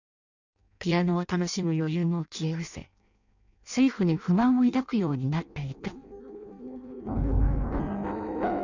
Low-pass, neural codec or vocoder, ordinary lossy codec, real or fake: 7.2 kHz; codec, 16 kHz in and 24 kHz out, 0.6 kbps, FireRedTTS-2 codec; none; fake